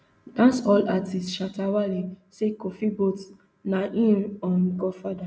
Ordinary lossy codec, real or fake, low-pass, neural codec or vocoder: none; real; none; none